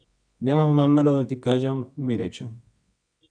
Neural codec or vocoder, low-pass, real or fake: codec, 24 kHz, 0.9 kbps, WavTokenizer, medium music audio release; 9.9 kHz; fake